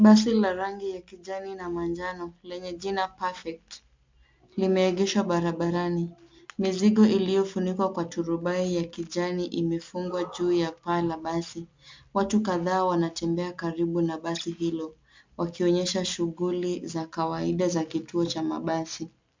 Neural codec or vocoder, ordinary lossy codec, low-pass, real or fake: none; MP3, 64 kbps; 7.2 kHz; real